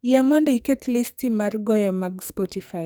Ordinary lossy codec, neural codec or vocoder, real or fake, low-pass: none; codec, 44.1 kHz, 2.6 kbps, SNAC; fake; none